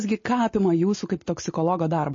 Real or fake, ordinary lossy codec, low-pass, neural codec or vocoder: real; MP3, 32 kbps; 7.2 kHz; none